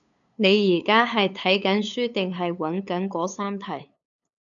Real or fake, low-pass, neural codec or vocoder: fake; 7.2 kHz; codec, 16 kHz, 8 kbps, FunCodec, trained on LibriTTS, 25 frames a second